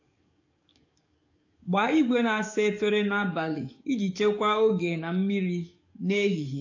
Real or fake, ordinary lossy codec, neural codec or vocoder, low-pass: fake; AAC, 48 kbps; codec, 44.1 kHz, 7.8 kbps, DAC; 7.2 kHz